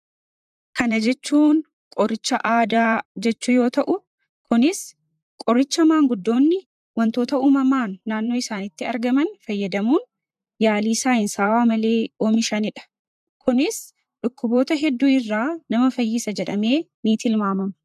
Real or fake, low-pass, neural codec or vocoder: fake; 14.4 kHz; vocoder, 44.1 kHz, 128 mel bands, Pupu-Vocoder